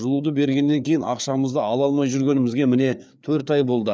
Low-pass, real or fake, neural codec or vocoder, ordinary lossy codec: none; fake; codec, 16 kHz, 4 kbps, FreqCodec, larger model; none